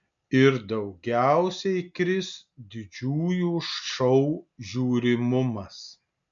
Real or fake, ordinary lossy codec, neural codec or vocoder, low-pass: real; MP3, 64 kbps; none; 7.2 kHz